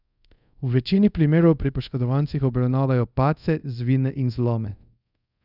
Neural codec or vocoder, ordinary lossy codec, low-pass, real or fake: codec, 24 kHz, 0.5 kbps, DualCodec; none; 5.4 kHz; fake